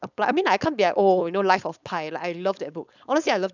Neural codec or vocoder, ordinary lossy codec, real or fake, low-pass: codec, 16 kHz, 4.8 kbps, FACodec; none; fake; 7.2 kHz